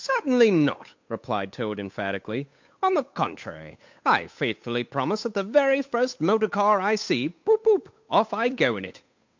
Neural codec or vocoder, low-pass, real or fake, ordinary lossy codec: codec, 16 kHz, 8 kbps, FunCodec, trained on Chinese and English, 25 frames a second; 7.2 kHz; fake; MP3, 48 kbps